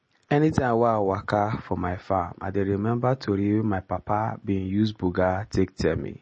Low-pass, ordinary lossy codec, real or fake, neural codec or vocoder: 10.8 kHz; MP3, 32 kbps; real; none